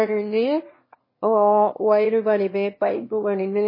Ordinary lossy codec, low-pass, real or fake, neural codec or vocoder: MP3, 24 kbps; 5.4 kHz; fake; autoencoder, 22.05 kHz, a latent of 192 numbers a frame, VITS, trained on one speaker